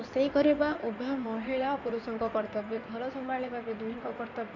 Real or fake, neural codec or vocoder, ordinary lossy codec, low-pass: fake; codec, 16 kHz in and 24 kHz out, 2.2 kbps, FireRedTTS-2 codec; MP3, 48 kbps; 7.2 kHz